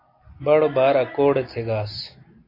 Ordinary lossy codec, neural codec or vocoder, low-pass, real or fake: AAC, 32 kbps; none; 5.4 kHz; real